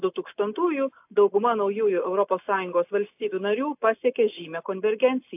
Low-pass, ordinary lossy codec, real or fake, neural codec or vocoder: 3.6 kHz; AAC, 32 kbps; real; none